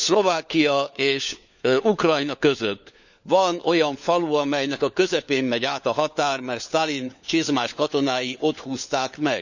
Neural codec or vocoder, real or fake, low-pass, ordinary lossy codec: codec, 16 kHz, 4 kbps, FunCodec, trained on LibriTTS, 50 frames a second; fake; 7.2 kHz; none